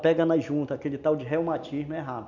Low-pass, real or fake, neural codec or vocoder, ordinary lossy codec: 7.2 kHz; real; none; none